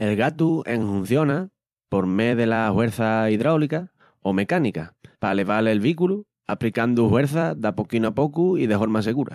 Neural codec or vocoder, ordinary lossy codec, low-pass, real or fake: vocoder, 44.1 kHz, 128 mel bands every 256 samples, BigVGAN v2; MP3, 96 kbps; 14.4 kHz; fake